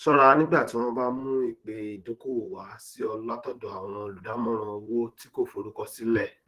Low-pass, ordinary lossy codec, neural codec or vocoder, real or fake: 14.4 kHz; Opus, 16 kbps; vocoder, 44.1 kHz, 128 mel bands, Pupu-Vocoder; fake